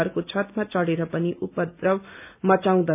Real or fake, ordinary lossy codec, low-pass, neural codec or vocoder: real; none; 3.6 kHz; none